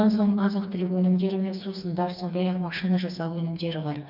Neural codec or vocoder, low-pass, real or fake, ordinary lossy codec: codec, 16 kHz, 2 kbps, FreqCodec, smaller model; 5.4 kHz; fake; none